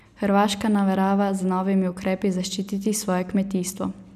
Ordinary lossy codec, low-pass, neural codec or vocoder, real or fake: none; 14.4 kHz; none; real